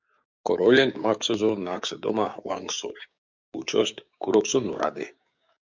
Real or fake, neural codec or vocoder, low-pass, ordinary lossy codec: fake; codec, 16 kHz, 6 kbps, DAC; 7.2 kHz; MP3, 64 kbps